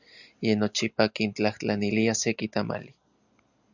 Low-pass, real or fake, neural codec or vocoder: 7.2 kHz; real; none